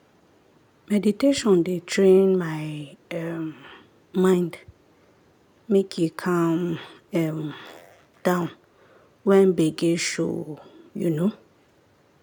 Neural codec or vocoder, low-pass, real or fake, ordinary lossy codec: none; none; real; none